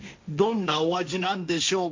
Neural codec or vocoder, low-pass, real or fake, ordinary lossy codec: codec, 16 kHz, 1.1 kbps, Voila-Tokenizer; 7.2 kHz; fake; MP3, 48 kbps